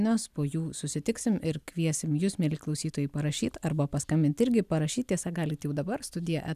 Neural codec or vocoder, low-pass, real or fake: none; 14.4 kHz; real